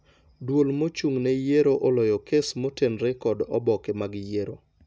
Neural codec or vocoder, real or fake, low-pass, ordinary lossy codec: none; real; none; none